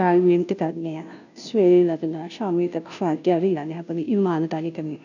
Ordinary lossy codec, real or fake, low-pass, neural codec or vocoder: none; fake; 7.2 kHz; codec, 16 kHz, 0.5 kbps, FunCodec, trained on Chinese and English, 25 frames a second